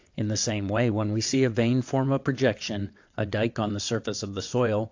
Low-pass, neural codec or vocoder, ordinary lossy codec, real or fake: 7.2 kHz; vocoder, 22.05 kHz, 80 mel bands, WaveNeXt; AAC, 48 kbps; fake